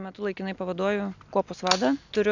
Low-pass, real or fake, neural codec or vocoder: 7.2 kHz; real; none